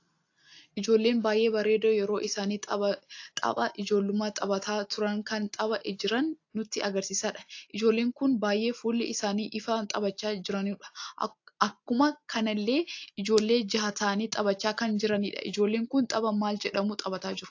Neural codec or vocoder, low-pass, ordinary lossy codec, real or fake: none; 7.2 kHz; AAC, 48 kbps; real